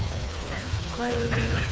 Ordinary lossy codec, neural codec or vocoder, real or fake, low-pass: none; codec, 16 kHz, 4 kbps, FreqCodec, smaller model; fake; none